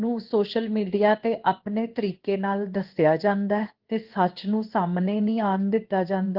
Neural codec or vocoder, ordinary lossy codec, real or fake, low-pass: codec, 16 kHz in and 24 kHz out, 1 kbps, XY-Tokenizer; Opus, 16 kbps; fake; 5.4 kHz